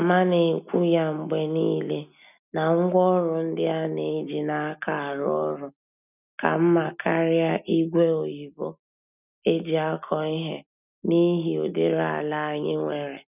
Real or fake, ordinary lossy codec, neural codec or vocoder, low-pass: real; none; none; 3.6 kHz